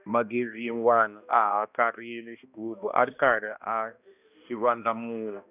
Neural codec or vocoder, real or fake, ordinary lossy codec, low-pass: codec, 16 kHz, 1 kbps, X-Codec, HuBERT features, trained on balanced general audio; fake; none; 3.6 kHz